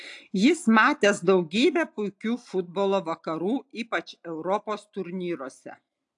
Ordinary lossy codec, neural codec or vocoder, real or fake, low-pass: AAC, 64 kbps; none; real; 10.8 kHz